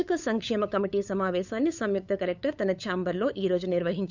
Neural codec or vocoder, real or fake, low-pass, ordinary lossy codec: codec, 16 kHz, 8 kbps, FunCodec, trained on LibriTTS, 25 frames a second; fake; 7.2 kHz; none